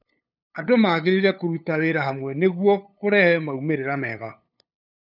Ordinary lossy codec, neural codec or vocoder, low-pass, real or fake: none; codec, 16 kHz, 8 kbps, FunCodec, trained on LibriTTS, 25 frames a second; 5.4 kHz; fake